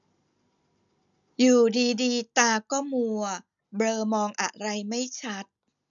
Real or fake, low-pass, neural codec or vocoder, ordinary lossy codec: real; 7.2 kHz; none; none